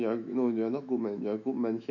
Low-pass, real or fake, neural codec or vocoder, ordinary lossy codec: 7.2 kHz; fake; autoencoder, 48 kHz, 128 numbers a frame, DAC-VAE, trained on Japanese speech; none